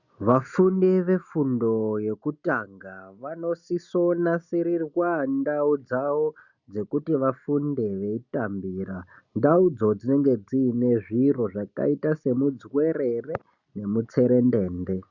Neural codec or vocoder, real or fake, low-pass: none; real; 7.2 kHz